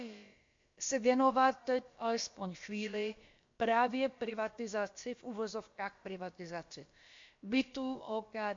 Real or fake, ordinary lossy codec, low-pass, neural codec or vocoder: fake; MP3, 48 kbps; 7.2 kHz; codec, 16 kHz, about 1 kbps, DyCAST, with the encoder's durations